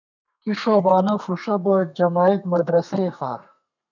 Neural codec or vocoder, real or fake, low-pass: codec, 32 kHz, 1.9 kbps, SNAC; fake; 7.2 kHz